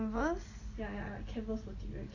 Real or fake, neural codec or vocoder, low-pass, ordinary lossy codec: real; none; 7.2 kHz; AAC, 32 kbps